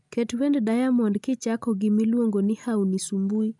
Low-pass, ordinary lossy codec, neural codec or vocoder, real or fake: 10.8 kHz; none; none; real